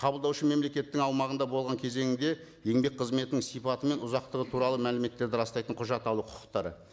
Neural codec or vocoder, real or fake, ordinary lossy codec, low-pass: none; real; none; none